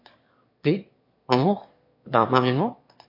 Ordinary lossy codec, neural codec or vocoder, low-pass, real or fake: MP3, 32 kbps; autoencoder, 22.05 kHz, a latent of 192 numbers a frame, VITS, trained on one speaker; 5.4 kHz; fake